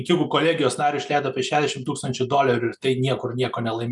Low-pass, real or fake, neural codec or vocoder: 10.8 kHz; real; none